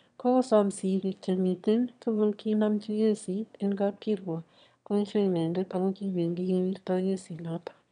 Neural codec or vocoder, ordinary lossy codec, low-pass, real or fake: autoencoder, 22.05 kHz, a latent of 192 numbers a frame, VITS, trained on one speaker; none; 9.9 kHz; fake